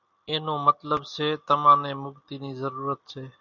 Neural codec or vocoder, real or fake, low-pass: none; real; 7.2 kHz